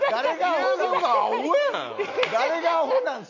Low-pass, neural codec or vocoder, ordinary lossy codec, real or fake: 7.2 kHz; none; none; real